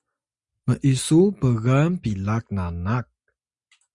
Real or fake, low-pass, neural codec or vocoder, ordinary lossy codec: real; 10.8 kHz; none; Opus, 64 kbps